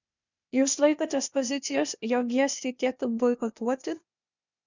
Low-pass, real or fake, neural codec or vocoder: 7.2 kHz; fake; codec, 16 kHz, 0.8 kbps, ZipCodec